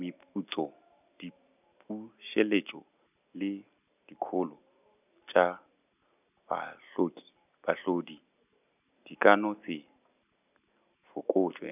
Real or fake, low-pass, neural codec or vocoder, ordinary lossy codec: real; 3.6 kHz; none; none